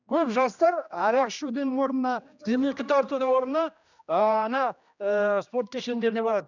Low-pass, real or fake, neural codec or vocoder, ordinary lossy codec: 7.2 kHz; fake; codec, 16 kHz, 1 kbps, X-Codec, HuBERT features, trained on general audio; none